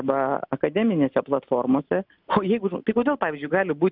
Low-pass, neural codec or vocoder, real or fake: 5.4 kHz; none; real